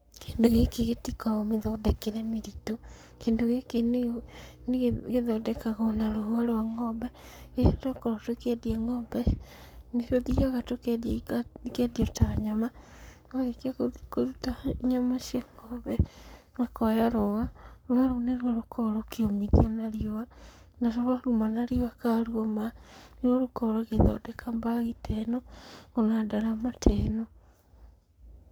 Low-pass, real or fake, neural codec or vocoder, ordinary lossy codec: none; fake; codec, 44.1 kHz, 7.8 kbps, Pupu-Codec; none